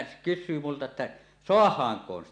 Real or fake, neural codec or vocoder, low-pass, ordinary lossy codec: real; none; 9.9 kHz; none